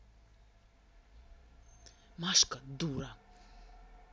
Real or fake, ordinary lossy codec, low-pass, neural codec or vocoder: real; none; none; none